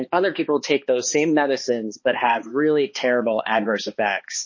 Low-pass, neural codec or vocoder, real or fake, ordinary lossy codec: 7.2 kHz; codec, 16 kHz, 2 kbps, X-Codec, HuBERT features, trained on balanced general audio; fake; MP3, 32 kbps